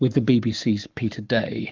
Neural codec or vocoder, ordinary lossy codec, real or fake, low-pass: codec, 44.1 kHz, 7.8 kbps, Pupu-Codec; Opus, 32 kbps; fake; 7.2 kHz